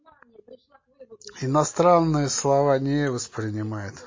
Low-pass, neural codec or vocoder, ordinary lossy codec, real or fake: 7.2 kHz; none; MP3, 32 kbps; real